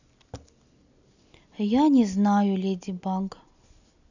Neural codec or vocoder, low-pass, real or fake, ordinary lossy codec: none; 7.2 kHz; real; none